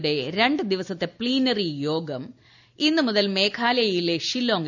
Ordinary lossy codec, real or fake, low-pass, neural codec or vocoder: none; real; 7.2 kHz; none